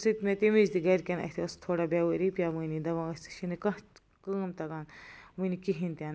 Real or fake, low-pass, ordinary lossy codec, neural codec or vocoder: real; none; none; none